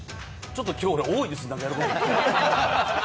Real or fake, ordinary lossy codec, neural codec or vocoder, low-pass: real; none; none; none